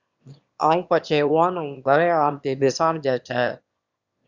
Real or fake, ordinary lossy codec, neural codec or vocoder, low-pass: fake; Opus, 64 kbps; autoencoder, 22.05 kHz, a latent of 192 numbers a frame, VITS, trained on one speaker; 7.2 kHz